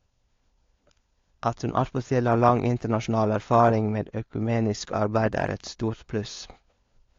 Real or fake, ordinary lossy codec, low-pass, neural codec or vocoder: fake; AAC, 48 kbps; 7.2 kHz; codec, 16 kHz, 4 kbps, FunCodec, trained on LibriTTS, 50 frames a second